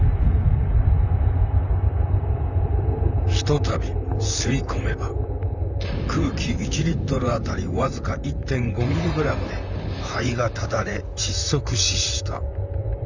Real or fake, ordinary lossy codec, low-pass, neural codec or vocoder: fake; none; 7.2 kHz; vocoder, 44.1 kHz, 128 mel bands, Pupu-Vocoder